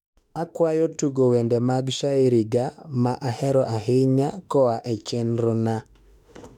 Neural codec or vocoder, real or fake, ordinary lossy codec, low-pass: autoencoder, 48 kHz, 32 numbers a frame, DAC-VAE, trained on Japanese speech; fake; none; 19.8 kHz